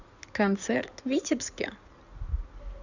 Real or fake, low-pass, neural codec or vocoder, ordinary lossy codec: fake; 7.2 kHz; codec, 16 kHz in and 24 kHz out, 2.2 kbps, FireRedTTS-2 codec; none